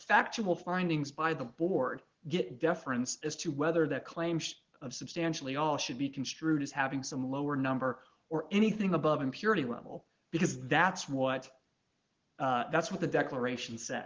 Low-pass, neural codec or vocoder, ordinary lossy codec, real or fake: 7.2 kHz; none; Opus, 16 kbps; real